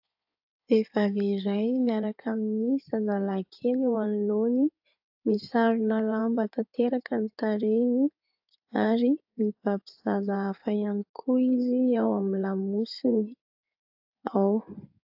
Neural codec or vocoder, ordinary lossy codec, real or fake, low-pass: codec, 16 kHz in and 24 kHz out, 2.2 kbps, FireRedTTS-2 codec; AAC, 48 kbps; fake; 5.4 kHz